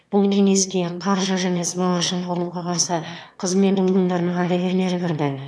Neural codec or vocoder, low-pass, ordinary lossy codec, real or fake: autoencoder, 22.05 kHz, a latent of 192 numbers a frame, VITS, trained on one speaker; none; none; fake